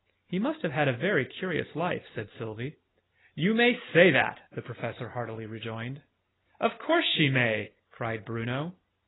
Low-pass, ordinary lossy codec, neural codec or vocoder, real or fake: 7.2 kHz; AAC, 16 kbps; none; real